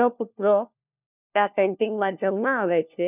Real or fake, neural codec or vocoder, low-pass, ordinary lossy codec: fake; codec, 16 kHz, 1 kbps, FunCodec, trained on LibriTTS, 50 frames a second; 3.6 kHz; none